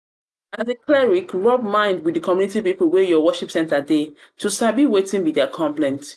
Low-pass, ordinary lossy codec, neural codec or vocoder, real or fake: none; none; none; real